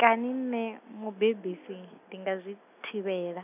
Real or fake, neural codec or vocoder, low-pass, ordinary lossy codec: real; none; 3.6 kHz; none